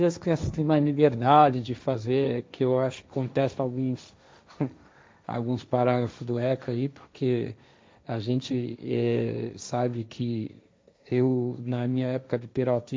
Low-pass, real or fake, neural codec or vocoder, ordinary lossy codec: none; fake; codec, 16 kHz, 1.1 kbps, Voila-Tokenizer; none